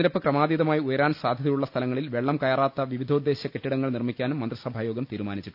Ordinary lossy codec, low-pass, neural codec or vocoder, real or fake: none; 5.4 kHz; none; real